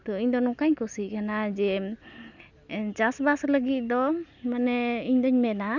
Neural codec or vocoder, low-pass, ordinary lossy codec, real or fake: none; 7.2 kHz; none; real